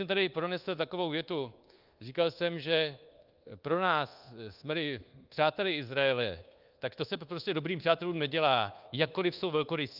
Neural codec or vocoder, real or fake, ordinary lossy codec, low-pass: codec, 24 kHz, 1.2 kbps, DualCodec; fake; Opus, 32 kbps; 5.4 kHz